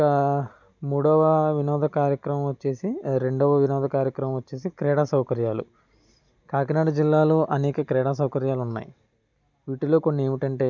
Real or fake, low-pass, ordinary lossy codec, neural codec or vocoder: real; 7.2 kHz; none; none